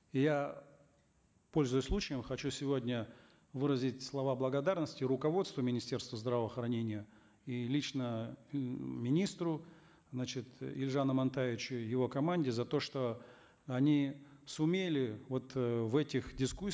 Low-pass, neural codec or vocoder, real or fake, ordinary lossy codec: none; none; real; none